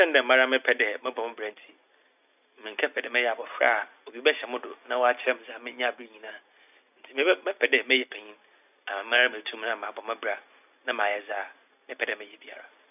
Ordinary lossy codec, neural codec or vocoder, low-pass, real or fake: none; none; 3.6 kHz; real